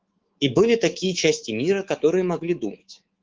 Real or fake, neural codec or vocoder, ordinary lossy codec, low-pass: fake; codec, 24 kHz, 3.1 kbps, DualCodec; Opus, 16 kbps; 7.2 kHz